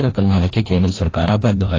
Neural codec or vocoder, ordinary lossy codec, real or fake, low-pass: codec, 16 kHz, 1 kbps, FreqCodec, larger model; AAC, 32 kbps; fake; 7.2 kHz